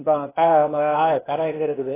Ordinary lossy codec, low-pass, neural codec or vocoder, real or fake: AAC, 16 kbps; 3.6 kHz; codec, 16 kHz, 0.8 kbps, ZipCodec; fake